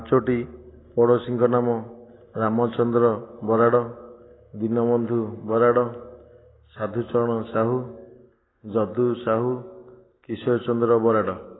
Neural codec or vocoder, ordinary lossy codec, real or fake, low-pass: none; AAC, 16 kbps; real; 7.2 kHz